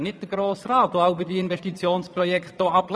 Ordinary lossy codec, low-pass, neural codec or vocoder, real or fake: none; none; vocoder, 22.05 kHz, 80 mel bands, Vocos; fake